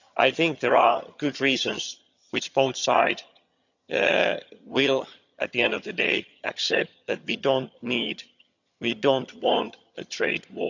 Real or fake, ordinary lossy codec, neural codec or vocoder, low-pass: fake; none; vocoder, 22.05 kHz, 80 mel bands, HiFi-GAN; 7.2 kHz